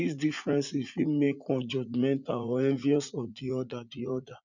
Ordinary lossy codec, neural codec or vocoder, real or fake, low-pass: none; vocoder, 44.1 kHz, 80 mel bands, Vocos; fake; 7.2 kHz